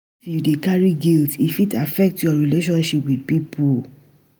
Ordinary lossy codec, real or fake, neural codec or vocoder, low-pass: none; real; none; none